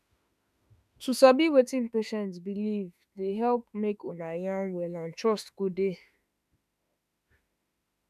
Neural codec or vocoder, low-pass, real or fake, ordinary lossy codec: autoencoder, 48 kHz, 32 numbers a frame, DAC-VAE, trained on Japanese speech; 14.4 kHz; fake; none